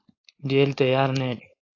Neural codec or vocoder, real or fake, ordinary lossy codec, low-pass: codec, 16 kHz, 4.8 kbps, FACodec; fake; AAC, 32 kbps; 7.2 kHz